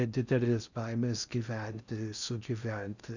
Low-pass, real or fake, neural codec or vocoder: 7.2 kHz; fake; codec, 16 kHz in and 24 kHz out, 0.6 kbps, FocalCodec, streaming, 4096 codes